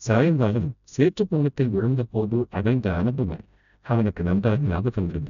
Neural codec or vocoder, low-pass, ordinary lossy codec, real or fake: codec, 16 kHz, 0.5 kbps, FreqCodec, smaller model; 7.2 kHz; none; fake